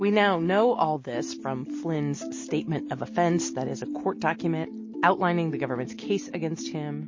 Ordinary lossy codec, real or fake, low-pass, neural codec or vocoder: MP3, 32 kbps; real; 7.2 kHz; none